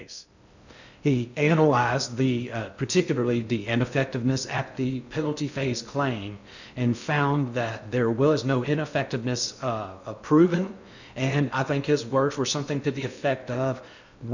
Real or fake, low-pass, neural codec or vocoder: fake; 7.2 kHz; codec, 16 kHz in and 24 kHz out, 0.6 kbps, FocalCodec, streaming, 2048 codes